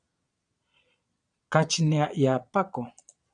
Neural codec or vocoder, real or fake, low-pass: vocoder, 22.05 kHz, 80 mel bands, Vocos; fake; 9.9 kHz